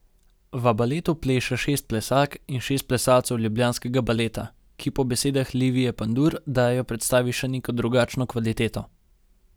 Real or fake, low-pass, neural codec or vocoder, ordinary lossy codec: real; none; none; none